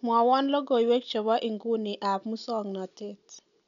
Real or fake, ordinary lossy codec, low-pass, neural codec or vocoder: real; none; 7.2 kHz; none